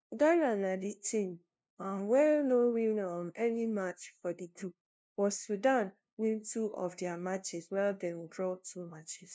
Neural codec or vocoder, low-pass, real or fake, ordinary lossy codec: codec, 16 kHz, 0.5 kbps, FunCodec, trained on LibriTTS, 25 frames a second; none; fake; none